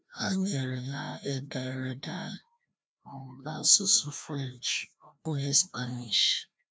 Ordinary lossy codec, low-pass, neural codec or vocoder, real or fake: none; none; codec, 16 kHz, 1 kbps, FreqCodec, larger model; fake